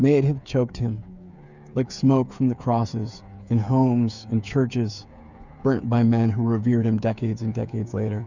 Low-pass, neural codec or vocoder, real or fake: 7.2 kHz; codec, 16 kHz, 8 kbps, FreqCodec, smaller model; fake